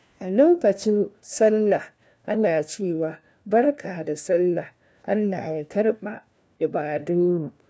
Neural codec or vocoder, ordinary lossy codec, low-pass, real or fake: codec, 16 kHz, 1 kbps, FunCodec, trained on LibriTTS, 50 frames a second; none; none; fake